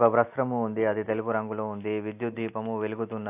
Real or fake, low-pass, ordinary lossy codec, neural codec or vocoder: real; 3.6 kHz; AAC, 32 kbps; none